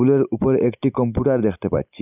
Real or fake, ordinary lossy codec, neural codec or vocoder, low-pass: real; none; none; 3.6 kHz